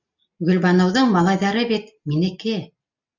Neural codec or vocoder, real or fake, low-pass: none; real; 7.2 kHz